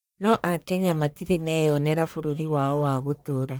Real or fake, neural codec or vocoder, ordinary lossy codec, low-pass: fake; codec, 44.1 kHz, 1.7 kbps, Pupu-Codec; none; none